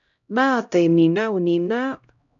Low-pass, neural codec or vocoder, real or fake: 7.2 kHz; codec, 16 kHz, 0.5 kbps, X-Codec, HuBERT features, trained on LibriSpeech; fake